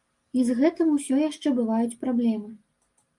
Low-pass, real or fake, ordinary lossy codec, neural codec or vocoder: 10.8 kHz; real; Opus, 32 kbps; none